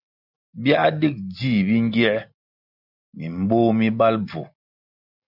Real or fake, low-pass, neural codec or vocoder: real; 5.4 kHz; none